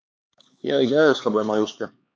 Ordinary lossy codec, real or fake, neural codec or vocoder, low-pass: none; fake; codec, 16 kHz, 4 kbps, X-Codec, HuBERT features, trained on LibriSpeech; none